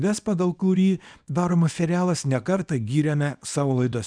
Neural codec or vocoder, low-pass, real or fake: codec, 24 kHz, 0.9 kbps, WavTokenizer, small release; 9.9 kHz; fake